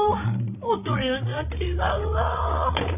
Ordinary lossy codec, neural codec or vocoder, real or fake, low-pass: none; codec, 16 kHz, 8 kbps, FreqCodec, larger model; fake; 3.6 kHz